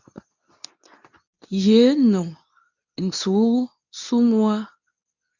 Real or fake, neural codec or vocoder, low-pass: fake; codec, 24 kHz, 0.9 kbps, WavTokenizer, medium speech release version 2; 7.2 kHz